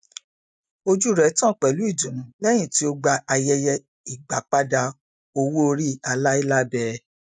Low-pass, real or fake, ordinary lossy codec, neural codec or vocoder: none; real; none; none